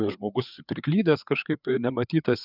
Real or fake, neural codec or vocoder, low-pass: fake; codec, 16 kHz, 4 kbps, FreqCodec, larger model; 5.4 kHz